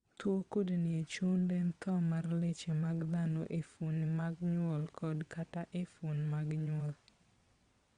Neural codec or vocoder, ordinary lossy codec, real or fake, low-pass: vocoder, 22.05 kHz, 80 mel bands, WaveNeXt; Opus, 64 kbps; fake; 9.9 kHz